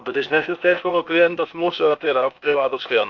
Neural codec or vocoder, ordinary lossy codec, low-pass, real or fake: codec, 16 kHz, 0.8 kbps, ZipCodec; MP3, 48 kbps; 7.2 kHz; fake